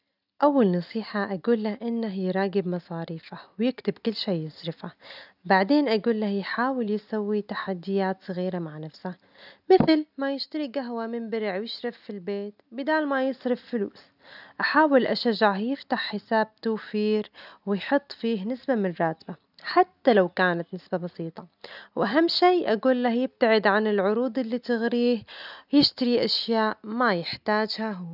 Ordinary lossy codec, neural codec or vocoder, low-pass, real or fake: none; none; 5.4 kHz; real